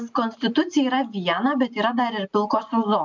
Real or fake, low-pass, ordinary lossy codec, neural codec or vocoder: real; 7.2 kHz; MP3, 64 kbps; none